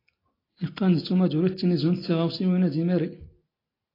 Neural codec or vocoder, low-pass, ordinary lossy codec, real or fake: none; 5.4 kHz; AAC, 24 kbps; real